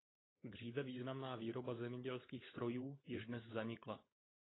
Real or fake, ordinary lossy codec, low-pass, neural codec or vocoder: fake; AAC, 16 kbps; 7.2 kHz; codec, 16 kHz, 16 kbps, FunCodec, trained on LibriTTS, 50 frames a second